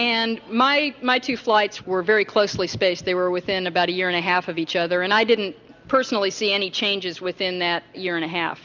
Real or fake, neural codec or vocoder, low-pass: real; none; 7.2 kHz